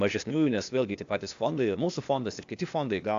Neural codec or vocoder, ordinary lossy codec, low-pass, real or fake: codec, 16 kHz, 0.8 kbps, ZipCodec; AAC, 64 kbps; 7.2 kHz; fake